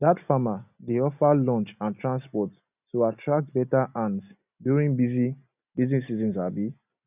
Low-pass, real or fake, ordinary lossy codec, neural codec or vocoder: 3.6 kHz; real; none; none